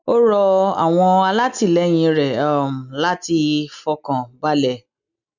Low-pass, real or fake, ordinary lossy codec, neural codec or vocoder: 7.2 kHz; real; none; none